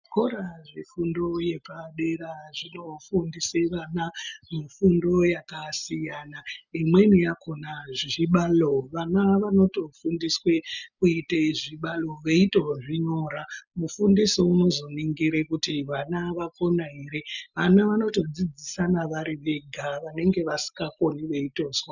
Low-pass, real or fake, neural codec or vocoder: 7.2 kHz; real; none